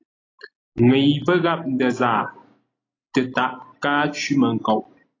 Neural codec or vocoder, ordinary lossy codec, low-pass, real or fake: none; AAC, 32 kbps; 7.2 kHz; real